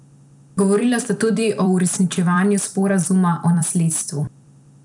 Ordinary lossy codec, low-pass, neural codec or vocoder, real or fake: none; 10.8 kHz; vocoder, 44.1 kHz, 128 mel bands every 256 samples, BigVGAN v2; fake